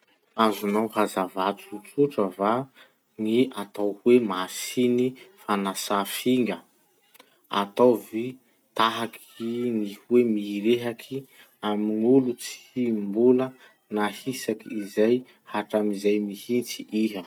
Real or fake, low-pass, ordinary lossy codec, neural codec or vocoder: real; 19.8 kHz; none; none